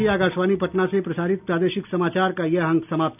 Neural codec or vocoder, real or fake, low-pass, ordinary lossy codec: none; real; 3.6 kHz; none